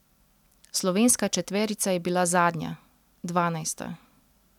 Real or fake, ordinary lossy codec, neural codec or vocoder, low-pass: real; none; none; 19.8 kHz